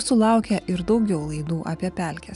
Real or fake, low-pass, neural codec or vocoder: real; 10.8 kHz; none